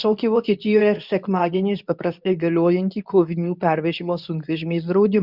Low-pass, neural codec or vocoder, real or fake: 5.4 kHz; codec, 24 kHz, 0.9 kbps, WavTokenizer, medium speech release version 2; fake